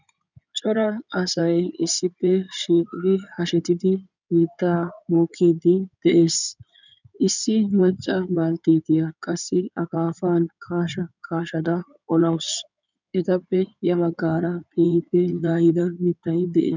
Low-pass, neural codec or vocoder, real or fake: 7.2 kHz; codec, 16 kHz in and 24 kHz out, 2.2 kbps, FireRedTTS-2 codec; fake